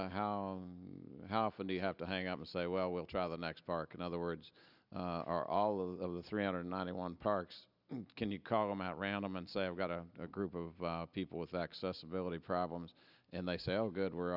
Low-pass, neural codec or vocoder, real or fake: 5.4 kHz; none; real